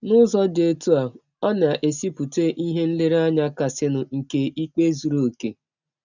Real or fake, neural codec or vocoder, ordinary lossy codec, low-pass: real; none; none; 7.2 kHz